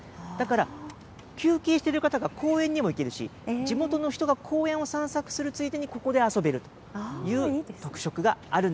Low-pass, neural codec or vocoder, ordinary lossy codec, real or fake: none; none; none; real